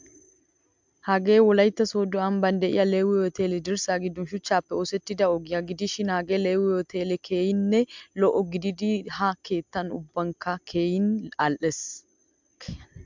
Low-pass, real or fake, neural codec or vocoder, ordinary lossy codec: 7.2 kHz; real; none; MP3, 64 kbps